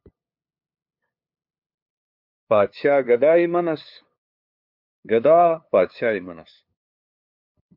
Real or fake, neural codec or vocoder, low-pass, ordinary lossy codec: fake; codec, 16 kHz, 2 kbps, FunCodec, trained on LibriTTS, 25 frames a second; 5.4 kHz; MP3, 48 kbps